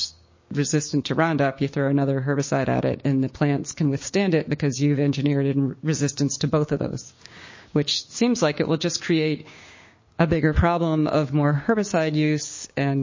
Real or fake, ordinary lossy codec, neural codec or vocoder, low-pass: fake; MP3, 32 kbps; codec, 16 kHz, 6 kbps, DAC; 7.2 kHz